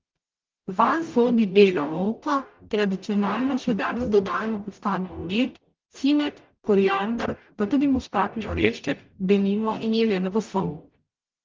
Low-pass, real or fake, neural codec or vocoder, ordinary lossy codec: 7.2 kHz; fake; codec, 44.1 kHz, 0.9 kbps, DAC; Opus, 32 kbps